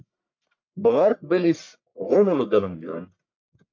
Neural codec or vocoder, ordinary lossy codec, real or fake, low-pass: codec, 44.1 kHz, 1.7 kbps, Pupu-Codec; MP3, 64 kbps; fake; 7.2 kHz